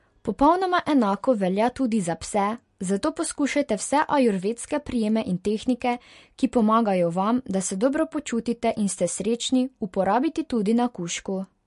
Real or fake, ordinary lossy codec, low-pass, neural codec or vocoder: real; MP3, 48 kbps; 10.8 kHz; none